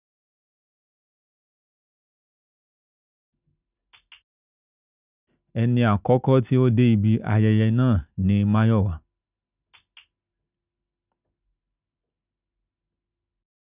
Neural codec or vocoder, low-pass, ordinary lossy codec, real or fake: none; 3.6 kHz; none; real